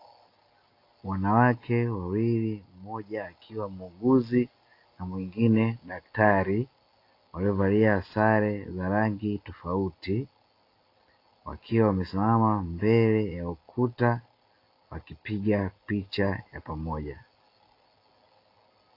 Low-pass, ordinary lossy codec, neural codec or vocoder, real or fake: 5.4 kHz; AAC, 32 kbps; none; real